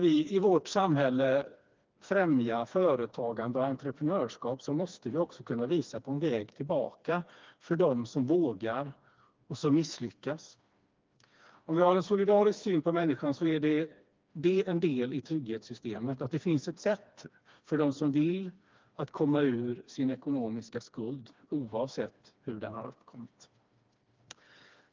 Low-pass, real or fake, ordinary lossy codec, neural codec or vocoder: 7.2 kHz; fake; Opus, 24 kbps; codec, 16 kHz, 2 kbps, FreqCodec, smaller model